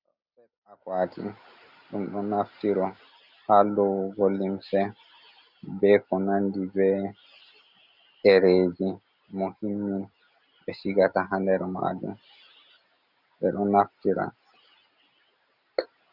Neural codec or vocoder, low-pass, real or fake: none; 5.4 kHz; real